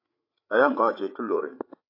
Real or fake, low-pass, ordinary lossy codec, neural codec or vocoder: fake; 5.4 kHz; AAC, 32 kbps; codec, 16 kHz, 16 kbps, FreqCodec, larger model